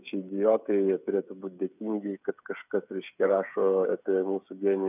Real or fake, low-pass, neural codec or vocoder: fake; 3.6 kHz; codec, 16 kHz, 8 kbps, FreqCodec, smaller model